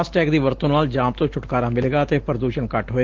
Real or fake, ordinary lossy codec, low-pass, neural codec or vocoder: real; Opus, 16 kbps; 7.2 kHz; none